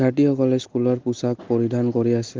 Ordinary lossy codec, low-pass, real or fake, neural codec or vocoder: Opus, 16 kbps; 7.2 kHz; real; none